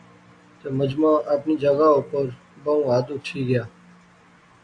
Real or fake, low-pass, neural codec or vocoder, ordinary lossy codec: real; 9.9 kHz; none; AAC, 48 kbps